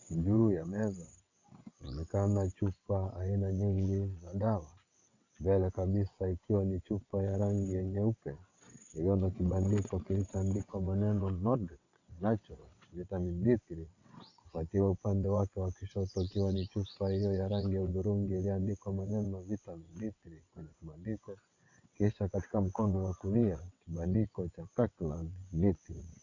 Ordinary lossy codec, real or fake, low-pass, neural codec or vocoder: AAC, 48 kbps; fake; 7.2 kHz; vocoder, 22.05 kHz, 80 mel bands, WaveNeXt